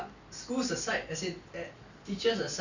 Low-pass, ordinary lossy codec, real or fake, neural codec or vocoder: 7.2 kHz; none; real; none